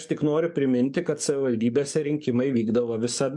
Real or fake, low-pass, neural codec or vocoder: fake; 10.8 kHz; codec, 44.1 kHz, 7.8 kbps, DAC